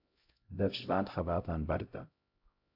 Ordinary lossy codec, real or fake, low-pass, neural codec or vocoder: AAC, 48 kbps; fake; 5.4 kHz; codec, 16 kHz, 0.5 kbps, X-Codec, HuBERT features, trained on LibriSpeech